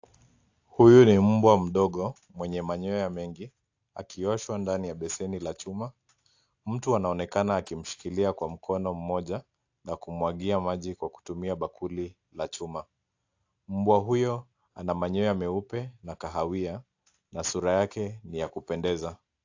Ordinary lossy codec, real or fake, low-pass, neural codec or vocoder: AAC, 48 kbps; real; 7.2 kHz; none